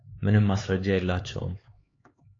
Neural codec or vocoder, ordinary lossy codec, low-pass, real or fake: codec, 16 kHz, 4 kbps, X-Codec, HuBERT features, trained on LibriSpeech; AAC, 32 kbps; 7.2 kHz; fake